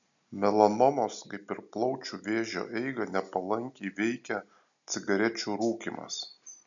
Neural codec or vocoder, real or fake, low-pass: none; real; 7.2 kHz